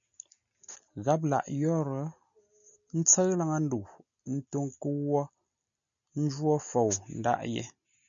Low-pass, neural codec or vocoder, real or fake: 7.2 kHz; none; real